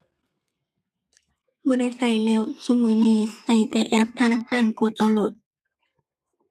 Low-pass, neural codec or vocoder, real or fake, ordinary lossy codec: 14.4 kHz; codec, 32 kHz, 1.9 kbps, SNAC; fake; none